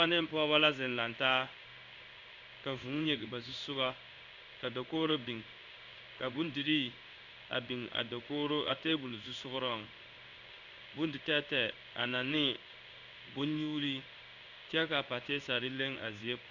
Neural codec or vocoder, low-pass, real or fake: codec, 16 kHz in and 24 kHz out, 1 kbps, XY-Tokenizer; 7.2 kHz; fake